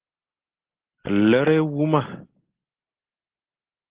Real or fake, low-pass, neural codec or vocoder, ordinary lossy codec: real; 3.6 kHz; none; Opus, 16 kbps